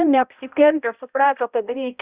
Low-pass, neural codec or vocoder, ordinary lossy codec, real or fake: 3.6 kHz; codec, 16 kHz, 0.5 kbps, X-Codec, HuBERT features, trained on balanced general audio; Opus, 64 kbps; fake